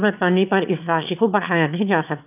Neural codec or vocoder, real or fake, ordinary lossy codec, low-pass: autoencoder, 22.05 kHz, a latent of 192 numbers a frame, VITS, trained on one speaker; fake; none; 3.6 kHz